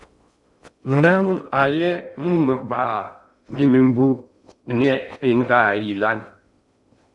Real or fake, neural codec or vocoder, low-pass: fake; codec, 16 kHz in and 24 kHz out, 0.6 kbps, FocalCodec, streaming, 2048 codes; 10.8 kHz